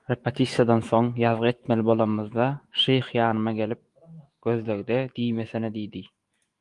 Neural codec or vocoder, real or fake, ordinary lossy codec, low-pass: autoencoder, 48 kHz, 128 numbers a frame, DAC-VAE, trained on Japanese speech; fake; Opus, 24 kbps; 10.8 kHz